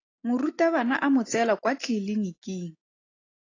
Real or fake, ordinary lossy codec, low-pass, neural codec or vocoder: real; AAC, 32 kbps; 7.2 kHz; none